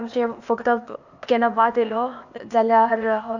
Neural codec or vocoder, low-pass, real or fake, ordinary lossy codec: codec, 16 kHz, 0.8 kbps, ZipCodec; 7.2 kHz; fake; none